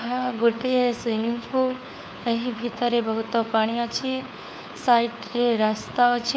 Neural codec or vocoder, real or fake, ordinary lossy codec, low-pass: codec, 16 kHz, 4 kbps, FunCodec, trained on LibriTTS, 50 frames a second; fake; none; none